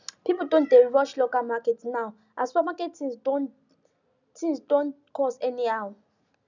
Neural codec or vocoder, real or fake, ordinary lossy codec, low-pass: none; real; none; 7.2 kHz